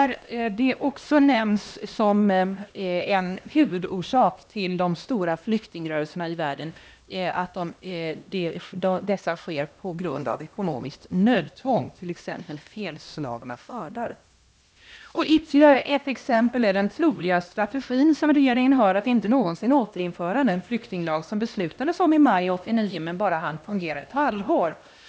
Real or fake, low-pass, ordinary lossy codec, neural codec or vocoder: fake; none; none; codec, 16 kHz, 1 kbps, X-Codec, HuBERT features, trained on LibriSpeech